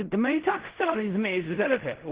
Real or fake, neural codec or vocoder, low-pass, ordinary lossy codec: fake; codec, 16 kHz in and 24 kHz out, 0.4 kbps, LongCat-Audio-Codec, fine tuned four codebook decoder; 3.6 kHz; Opus, 16 kbps